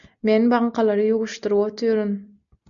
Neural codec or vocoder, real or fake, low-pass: none; real; 7.2 kHz